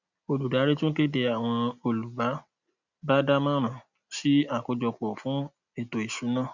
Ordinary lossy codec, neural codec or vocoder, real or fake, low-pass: none; none; real; 7.2 kHz